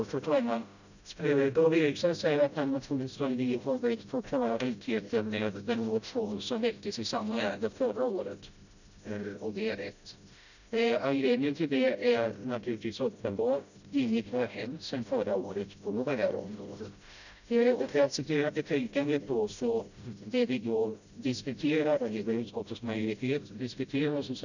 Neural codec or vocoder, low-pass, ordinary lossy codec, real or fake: codec, 16 kHz, 0.5 kbps, FreqCodec, smaller model; 7.2 kHz; none; fake